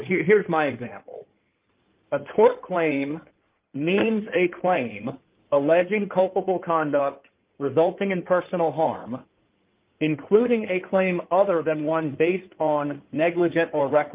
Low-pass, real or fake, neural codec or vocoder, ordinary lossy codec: 3.6 kHz; fake; codec, 16 kHz in and 24 kHz out, 2.2 kbps, FireRedTTS-2 codec; Opus, 24 kbps